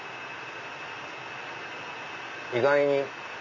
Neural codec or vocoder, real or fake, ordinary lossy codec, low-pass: none; real; MP3, 48 kbps; 7.2 kHz